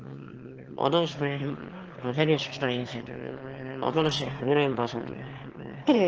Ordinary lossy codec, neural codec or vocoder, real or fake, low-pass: Opus, 16 kbps; autoencoder, 22.05 kHz, a latent of 192 numbers a frame, VITS, trained on one speaker; fake; 7.2 kHz